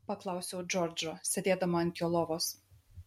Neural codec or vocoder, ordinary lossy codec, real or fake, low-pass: none; MP3, 64 kbps; real; 19.8 kHz